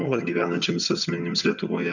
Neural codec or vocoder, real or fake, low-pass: vocoder, 22.05 kHz, 80 mel bands, HiFi-GAN; fake; 7.2 kHz